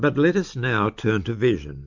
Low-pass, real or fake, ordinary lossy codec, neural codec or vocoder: 7.2 kHz; real; MP3, 64 kbps; none